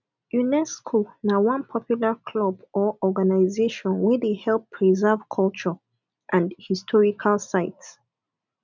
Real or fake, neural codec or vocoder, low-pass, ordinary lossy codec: real; none; 7.2 kHz; none